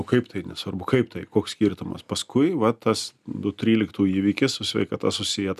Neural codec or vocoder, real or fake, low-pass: none; real; 14.4 kHz